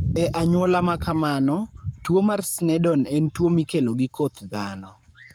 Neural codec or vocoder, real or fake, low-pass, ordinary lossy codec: codec, 44.1 kHz, 7.8 kbps, Pupu-Codec; fake; none; none